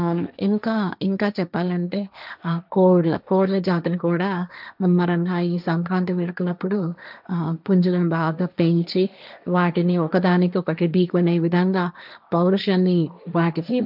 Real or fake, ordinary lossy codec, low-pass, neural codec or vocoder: fake; none; 5.4 kHz; codec, 16 kHz, 1.1 kbps, Voila-Tokenizer